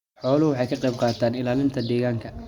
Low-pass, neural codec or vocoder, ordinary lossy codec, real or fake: 19.8 kHz; none; Opus, 64 kbps; real